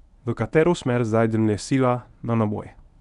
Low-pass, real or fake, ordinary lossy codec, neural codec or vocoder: 10.8 kHz; fake; none; codec, 24 kHz, 0.9 kbps, WavTokenizer, medium speech release version 1